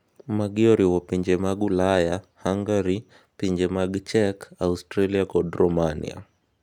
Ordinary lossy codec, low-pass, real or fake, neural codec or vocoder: none; 19.8 kHz; real; none